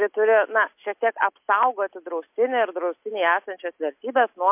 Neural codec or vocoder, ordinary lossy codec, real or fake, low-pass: none; MP3, 32 kbps; real; 3.6 kHz